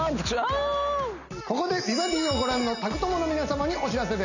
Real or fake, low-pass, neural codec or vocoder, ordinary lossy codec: real; 7.2 kHz; none; none